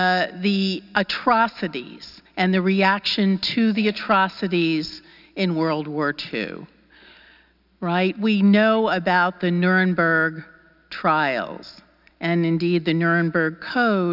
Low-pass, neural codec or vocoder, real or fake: 5.4 kHz; none; real